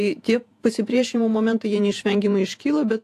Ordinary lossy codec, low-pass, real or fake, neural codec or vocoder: AAC, 64 kbps; 14.4 kHz; fake; vocoder, 48 kHz, 128 mel bands, Vocos